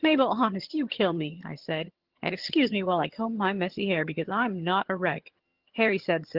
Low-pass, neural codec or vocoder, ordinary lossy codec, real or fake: 5.4 kHz; vocoder, 22.05 kHz, 80 mel bands, HiFi-GAN; Opus, 16 kbps; fake